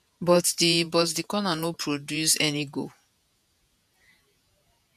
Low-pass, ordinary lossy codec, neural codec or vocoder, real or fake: 14.4 kHz; none; vocoder, 48 kHz, 128 mel bands, Vocos; fake